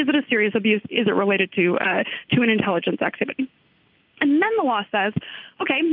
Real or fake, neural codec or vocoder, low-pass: real; none; 5.4 kHz